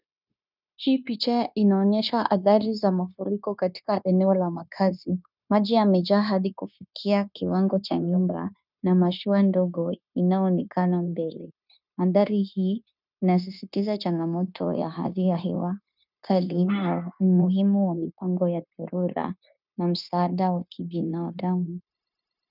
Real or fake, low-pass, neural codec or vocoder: fake; 5.4 kHz; codec, 16 kHz, 0.9 kbps, LongCat-Audio-Codec